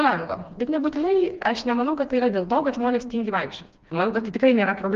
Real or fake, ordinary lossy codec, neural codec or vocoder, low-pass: fake; Opus, 32 kbps; codec, 16 kHz, 2 kbps, FreqCodec, smaller model; 7.2 kHz